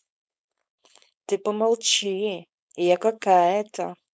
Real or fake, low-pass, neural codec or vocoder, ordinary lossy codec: fake; none; codec, 16 kHz, 4.8 kbps, FACodec; none